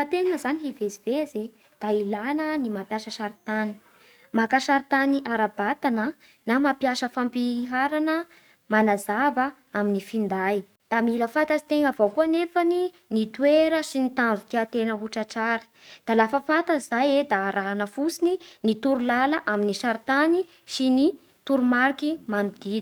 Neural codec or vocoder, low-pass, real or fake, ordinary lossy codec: codec, 44.1 kHz, 7.8 kbps, DAC; none; fake; none